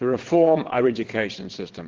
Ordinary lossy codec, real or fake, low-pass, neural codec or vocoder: Opus, 16 kbps; fake; 7.2 kHz; vocoder, 22.05 kHz, 80 mel bands, WaveNeXt